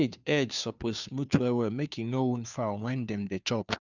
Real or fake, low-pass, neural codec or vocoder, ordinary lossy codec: fake; 7.2 kHz; codec, 16 kHz, 2 kbps, FunCodec, trained on Chinese and English, 25 frames a second; none